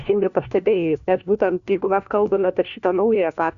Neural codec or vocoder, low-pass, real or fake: codec, 16 kHz, 1 kbps, FunCodec, trained on LibriTTS, 50 frames a second; 7.2 kHz; fake